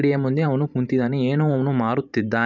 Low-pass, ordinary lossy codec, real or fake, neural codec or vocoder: 7.2 kHz; none; fake; vocoder, 44.1 kHz, 128 mel bands every 512 samples, BigVGAN v2